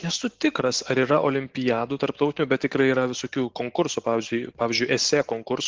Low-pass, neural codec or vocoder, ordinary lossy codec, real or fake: 7.2 kHz; none; Opus, 16 kbps; real